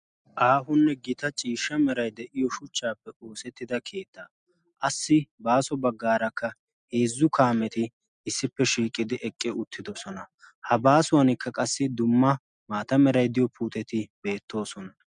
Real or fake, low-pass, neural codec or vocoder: real; 9.9 kHz; none